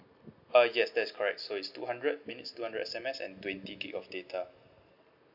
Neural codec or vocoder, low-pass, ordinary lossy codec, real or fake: none; 5.4 kHz; none; real